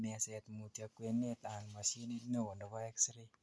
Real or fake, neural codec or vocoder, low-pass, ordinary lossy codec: real; none; none; none